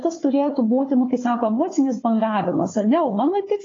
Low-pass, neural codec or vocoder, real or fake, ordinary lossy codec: 7.2 kHz; codec, 16 kHz, 4 kbps, FunCodec, trained on Chinese and English, 50 frames a second; fake; AAC, 32 kbps